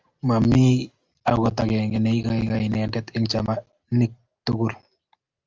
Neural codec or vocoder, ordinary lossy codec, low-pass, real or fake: none; Opus, 32 kbps; 7.2 kHz; real